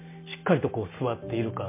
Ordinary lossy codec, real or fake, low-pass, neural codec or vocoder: MP3, 24 kbps; real; 3.6 kHz; none